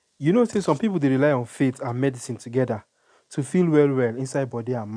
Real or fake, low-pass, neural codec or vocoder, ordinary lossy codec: real; 9.9 kHz; none; none